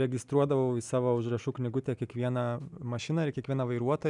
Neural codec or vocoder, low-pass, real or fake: none; 10.8 kHz; real